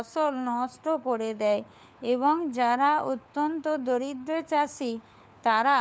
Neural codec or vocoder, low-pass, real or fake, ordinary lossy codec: codec, 16 kHz, 4 kbps, FunCodec, trained on LibriTTS, 50 frames a second; none; fake; none